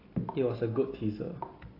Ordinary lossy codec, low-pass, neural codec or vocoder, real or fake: AAC, 24 kbps; 5.4 kHz; none; real